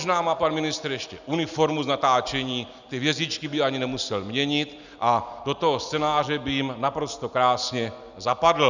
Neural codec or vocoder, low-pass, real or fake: none; 7.2 kHz; real